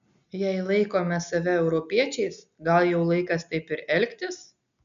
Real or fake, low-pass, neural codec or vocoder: real; 7.2 kHz; none